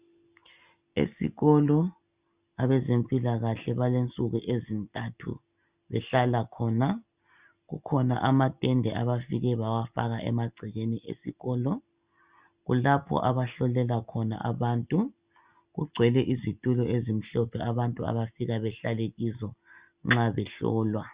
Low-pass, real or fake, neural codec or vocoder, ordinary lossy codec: 3.6 kHz; real; none; Opus, 64 kbps